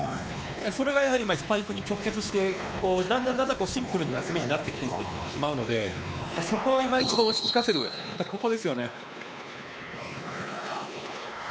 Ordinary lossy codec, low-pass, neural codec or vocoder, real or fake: none; none; codec, 16 kHz, 2 kbps, X-Codec, WavLM features, trained on Multilingual LibriSpeech; fake